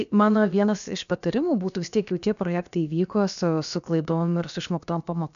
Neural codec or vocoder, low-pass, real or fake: codec, 16 kHz, about 1 kbps, DyCAST, with the encoder's durations; 7.2 kHz; fake